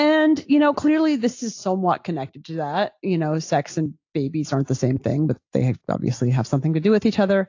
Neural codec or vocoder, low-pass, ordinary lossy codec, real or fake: none; 7.2 kHz; AAC, 48 kbps; real